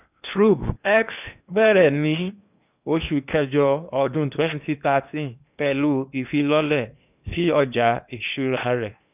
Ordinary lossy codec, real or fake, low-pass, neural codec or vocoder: none; fake; 3.6 kHz; codec, 16 kHz in and 24 kHz out, 0.8 kbps, FocalCodec, streaming, 65536 codes